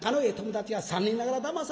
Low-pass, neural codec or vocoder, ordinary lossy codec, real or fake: none; none; none; real